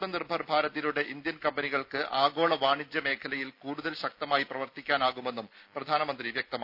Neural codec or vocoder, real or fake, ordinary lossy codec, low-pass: none; real; none; 5.4 kHz